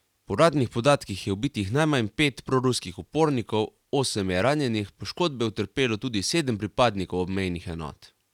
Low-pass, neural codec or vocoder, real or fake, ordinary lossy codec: 19.8 kHz; none; real; none